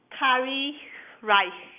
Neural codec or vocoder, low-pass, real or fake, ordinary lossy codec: none; 3.6 kHz; real; none